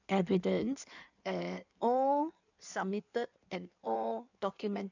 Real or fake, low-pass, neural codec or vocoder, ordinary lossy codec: fake; 7.2 kHz; codec, 16 kHz in and 24 kHz out, 1.1 kbps, FireRedTTS-2 codec; none